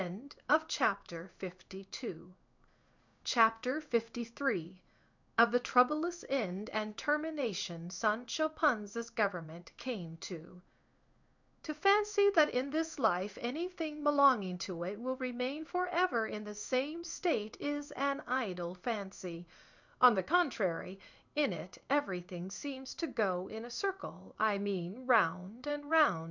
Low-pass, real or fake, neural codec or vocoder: 7.2 kHz; fake; codec, 16 kHz in and 24 kHz out, 1 kbps, XY-Tokenizer